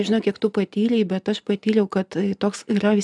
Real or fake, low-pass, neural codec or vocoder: real; 10.8 kHz; none